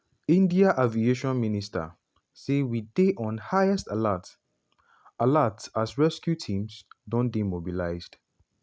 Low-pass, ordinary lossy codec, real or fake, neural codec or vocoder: none; none; real; none